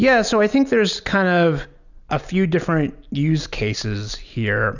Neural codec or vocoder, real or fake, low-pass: none; real; 7.2 kHz